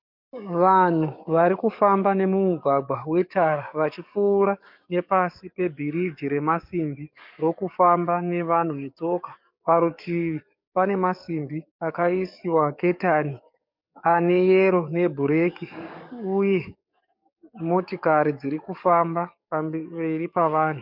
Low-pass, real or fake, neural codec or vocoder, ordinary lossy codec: 5.4 kHz; fake; codec, 44.1 kHz, 7.8 kbps, DAC; MP3, 48 kbps